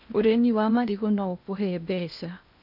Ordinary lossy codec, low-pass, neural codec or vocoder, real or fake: none; 5.4 kHz; codec, 16 kHz in and 24 kHz out, 0.8 kbps, FocalCodec, streaming, 65536 codes; fake